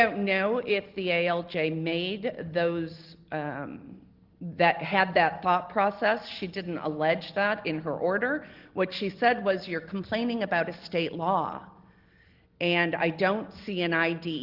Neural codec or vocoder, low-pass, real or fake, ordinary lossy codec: none; 5.4 kHz; real; Opus, 16 kbps